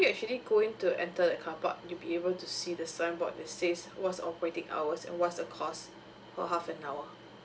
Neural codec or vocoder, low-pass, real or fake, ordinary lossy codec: none; none; real; none